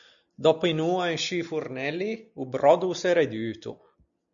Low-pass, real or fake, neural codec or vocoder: 7.2 kHz; real; none